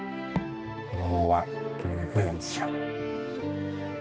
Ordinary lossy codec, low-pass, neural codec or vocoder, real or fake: none; none; codec, 16 kHz, 2 kbps, X-Codec, HuBERT features, trained on balanced general audio; fake